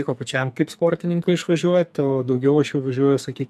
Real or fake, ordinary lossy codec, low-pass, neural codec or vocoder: fake; AAC, 96 kbps; 14.4 kHz; codec, 44.1 kHz, 2.6 kbps, SNAC